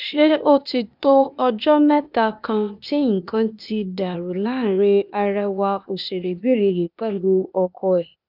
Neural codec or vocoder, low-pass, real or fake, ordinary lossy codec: codec, 16 kHz, 0.8 kbps, ZipCodec; 5.4 kHz; fake; none